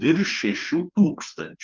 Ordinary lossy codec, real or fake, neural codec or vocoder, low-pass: Opus, 24 kbps; fake; codec, 16 kHz, 2 kbps, FreqCodec, larger model; 7.2 kHz